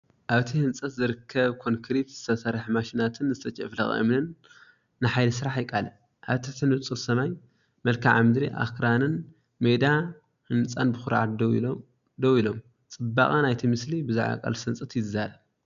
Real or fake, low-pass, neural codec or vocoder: real; 7.2 kHz; none